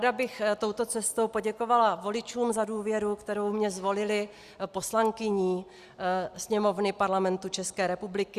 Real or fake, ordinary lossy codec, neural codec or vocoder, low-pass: real; Opus, 64 kbps; none; 14.4 kHz